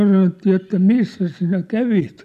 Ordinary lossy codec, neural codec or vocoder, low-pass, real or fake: none; none; 14.4 kHz; real